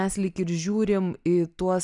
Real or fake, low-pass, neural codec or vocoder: real; 10.8 kHz; none